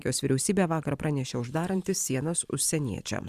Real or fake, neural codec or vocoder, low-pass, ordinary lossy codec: fake; vocoder, 44.1 kHz, 128 mel bands every 512 samples, BigVGAN v2; 14.4 kHz; Opus, 64 kbps